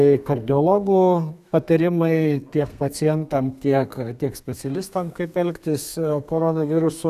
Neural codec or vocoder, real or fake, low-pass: codec, 32 kHz, 1.9 kbps, SNAC; fake; 14.4 kHz